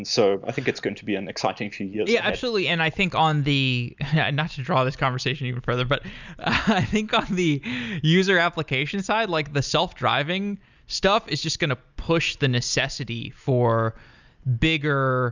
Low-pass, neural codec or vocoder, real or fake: 7.2 kHz; none; real